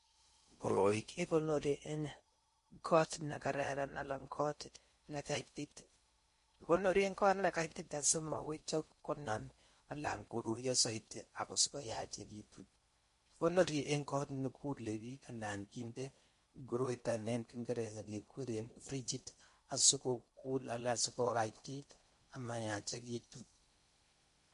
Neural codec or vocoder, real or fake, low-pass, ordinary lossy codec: codec, 16 kHz in and 24 kHz out, 0.6 kbps, FocalCodec, streaming, 4096 codes; fake; 10.8 kHz; MP3, 48 kbps